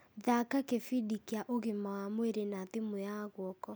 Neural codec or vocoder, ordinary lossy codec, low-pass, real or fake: none; none; none; real